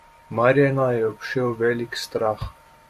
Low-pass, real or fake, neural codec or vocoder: 14.4 kHz; fake; vocoder, 44.1 kHz, 128 mel bands every 512 samples, BigVGAN v2